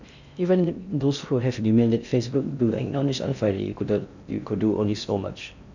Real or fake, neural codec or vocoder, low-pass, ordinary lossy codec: fake; codec, 16 kHz in and 24 kHz out, 0.6 kbps, FocalCodec, streaming, 2048 codes; 7.2 kHz; none